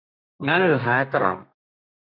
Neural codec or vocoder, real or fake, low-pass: codec, 32 kHz, 1.9 kbps, SNAC; fake; 5.4 kHz